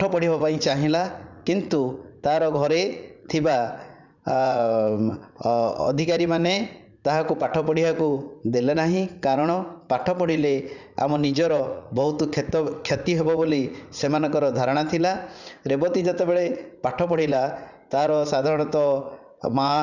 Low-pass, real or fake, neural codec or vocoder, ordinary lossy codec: 7.2 kHz; fake; vocoder, 44.1 kHz, 80 mel bands, Vocos; none